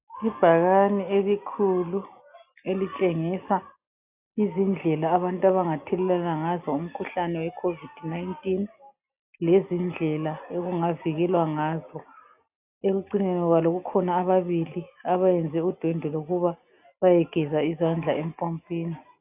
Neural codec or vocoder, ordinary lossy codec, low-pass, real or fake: none; AAC, 32 kbps; 3.6 kHz; real